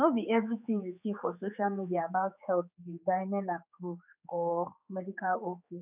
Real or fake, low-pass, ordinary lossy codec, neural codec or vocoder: fake; 3.6 kHz; none; codec, 16 kHz, 4 kbps, X-Codec, HuBERT features, trained on general audio